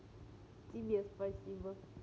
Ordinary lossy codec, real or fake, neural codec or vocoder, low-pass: none; real; none; none